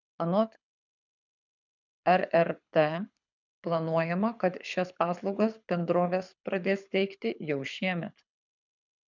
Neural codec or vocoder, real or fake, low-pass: codec, 24 kHz, 6 kbps, HILCodec; fake; 7.2 kHz